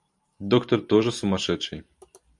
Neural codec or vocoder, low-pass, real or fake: vocoder, 44.1 kHz, 128 mel bands every 512 samples, BigVGAN v2; 10.8 kHz; fake